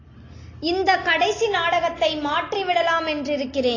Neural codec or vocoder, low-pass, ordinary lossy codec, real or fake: none; 7.2 kHz; AAC, 32 kbps; real